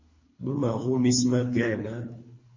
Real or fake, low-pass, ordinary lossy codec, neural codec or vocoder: fake; 7.2 kHz; MP3, 32 kbps; codec, 24 kHz, 3 kbps, HILCodec